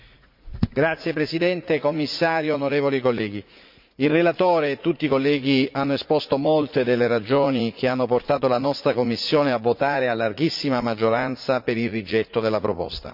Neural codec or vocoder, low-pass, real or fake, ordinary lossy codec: vocoder, 44.1 kHz, 80 mel bands, Vocos; 5.4 kHz; fake; MP3, 48 kbps